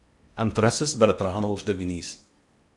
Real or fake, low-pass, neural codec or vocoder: fake; 10.8 kHz; codec, 16 kHz in and 24 kHz out, 0.8 kbps, FocalCodec, streaming, 65536 codes